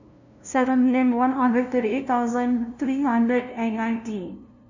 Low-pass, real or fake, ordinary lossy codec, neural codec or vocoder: 7.2 kHz; fake; none; codec, 16 kHz, 0.5 kbps, FunCodec, trained on LibriTTS, 25 frames a second